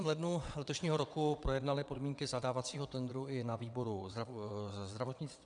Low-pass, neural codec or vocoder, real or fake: 9.9 kHz; vocoder, 22.05 kHz, 80 mel bands, WaveNeXt; fake